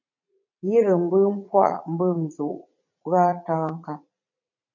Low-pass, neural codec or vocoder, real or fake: 7.2 kHz; vocoder, 44.1 kHz, 80 mel bands, Vocos; fake